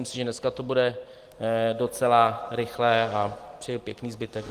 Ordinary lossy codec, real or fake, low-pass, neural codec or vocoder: Opus, 24 kbps; real; 14.4 kHz; none